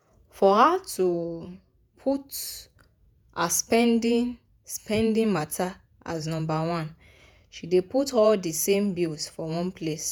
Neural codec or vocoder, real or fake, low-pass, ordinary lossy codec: vocoder, 48 kHz, 128 mel bands, Vocos; fake; none; none